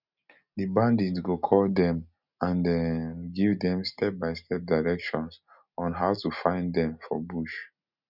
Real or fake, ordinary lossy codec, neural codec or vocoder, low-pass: real; none; none; 5.4 kHz